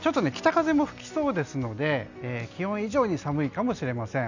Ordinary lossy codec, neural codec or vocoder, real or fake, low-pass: none; none; real; 7.2 kHz